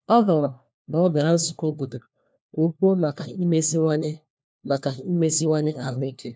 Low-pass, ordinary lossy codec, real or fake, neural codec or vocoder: none; none; fake; codec, 16 kHz, 1 kbps, FunCodec, trained on LibriTTS, 50 frames a second